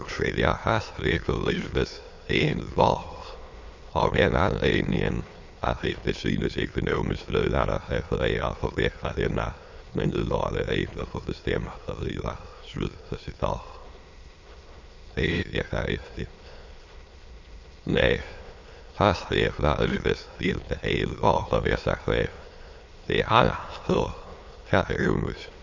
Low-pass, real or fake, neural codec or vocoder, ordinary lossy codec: 7.2 kHz; fake; autoencoder, 22.05 kHz, a latent of 192 numbers a frame, VITS, trained on many speakers; MP3, 48 kbps